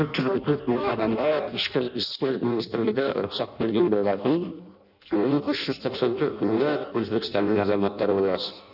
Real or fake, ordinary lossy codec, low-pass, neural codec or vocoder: fake; none; 5.4 kHz; codec, 16 kHz in and 24 kHz out, 0.6 kbps, FireRedTTS-2 codec